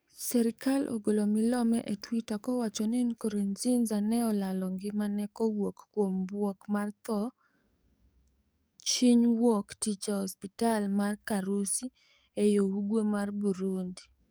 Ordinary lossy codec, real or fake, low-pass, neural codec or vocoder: none; fake; none; codec, 44.1 kHz, 7.8 kbps, DAC